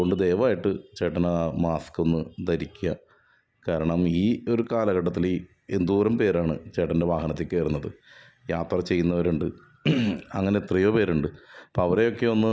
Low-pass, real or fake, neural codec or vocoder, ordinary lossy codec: none; real; none; none